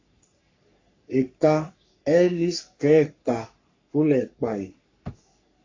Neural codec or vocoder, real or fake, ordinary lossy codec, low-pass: codec, 44.1 kHz, 3.4 kbps, Pupu-Codec; fake; AAC, 48 kbps; 7.2 kHz